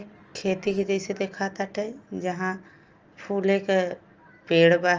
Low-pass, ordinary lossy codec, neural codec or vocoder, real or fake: 7.2 kHz; Opus, 24 kbps; none; real